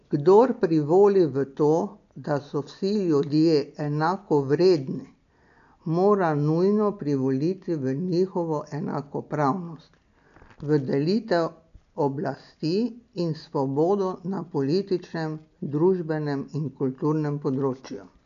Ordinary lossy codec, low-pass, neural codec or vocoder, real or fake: none; 7.2 kHz; none; real